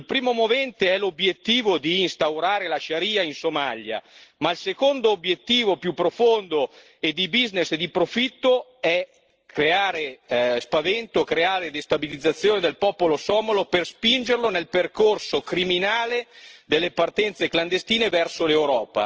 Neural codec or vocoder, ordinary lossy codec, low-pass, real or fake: none; Opus, 16 kbps; 7.2 kHz; real